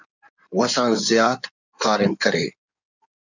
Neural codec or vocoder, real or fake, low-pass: codec, 16 kHz in and 24 kHz out, 2.2 kbps, FireRedTTS-2 codec; fake; 7.2 kHz